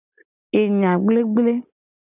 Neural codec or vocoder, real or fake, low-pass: none; real; 3.6 kHz